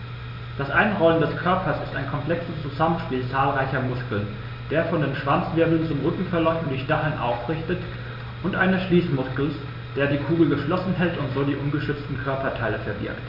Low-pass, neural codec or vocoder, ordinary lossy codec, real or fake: 5.4 kHz; none; MP3, 48 kbps; real